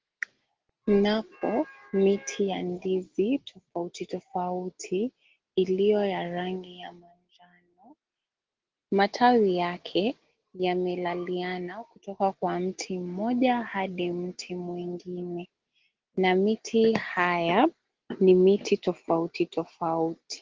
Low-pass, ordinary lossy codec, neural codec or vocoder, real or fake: 7.2 kHz; Opus, 16 kbps; none; real